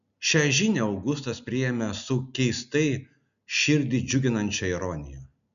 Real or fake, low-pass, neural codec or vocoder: real; 7.2 kHz; none